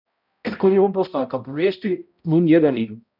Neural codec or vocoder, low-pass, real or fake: codec, 16 kHz, 0.5 kbps, X-Codec, HuBERT features, trained on balanced general audio; 5.4 kHz; fake